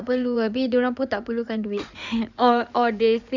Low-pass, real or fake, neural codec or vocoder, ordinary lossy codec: 7.2 kHz; fake; codec, 16 kHz in and 24 kHz out, 2.2 kbps, FireRedTTS-2 codec; none